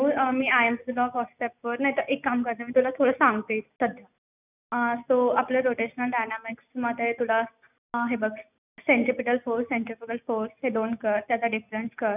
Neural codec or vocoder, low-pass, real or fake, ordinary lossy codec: none; 3.6 kHz; real; none